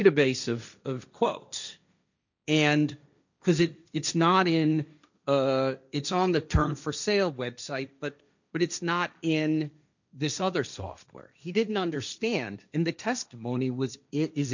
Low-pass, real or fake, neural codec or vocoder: 7.2 kHz; fake; codec, 16 kHz, 1.1 kbps, Voila-Tokenizer